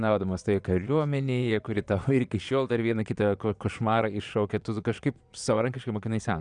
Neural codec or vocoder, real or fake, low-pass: vocoder, 22.05 kHz, 80 mel bands, Vocos; fake; 9.9 kHz